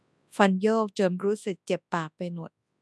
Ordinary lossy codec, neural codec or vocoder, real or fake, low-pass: none; codec, 24 kHz, 0.9 kbps, WavTokenizer, large speech release; fake; none